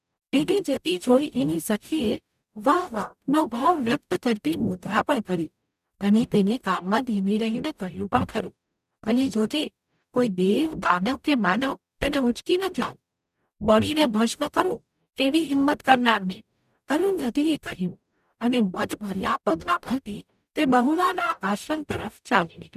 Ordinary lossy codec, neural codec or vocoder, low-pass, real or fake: none; codec, 44.1 kHz, 0.9 kbps, DAC; 14.4 kHz; fake